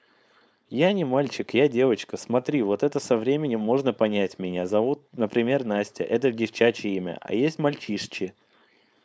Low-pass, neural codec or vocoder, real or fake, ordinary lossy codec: none; codec, 16 kHz, 4.8 kbps, FACodec; fake; none